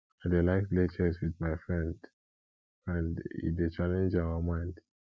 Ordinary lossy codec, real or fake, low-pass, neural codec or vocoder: none; real; none; none